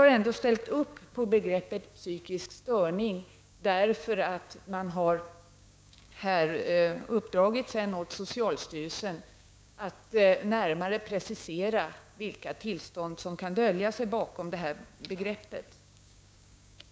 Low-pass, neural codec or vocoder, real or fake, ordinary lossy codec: none; codec, 16 kHz, 6 kbps, DAC; fake; none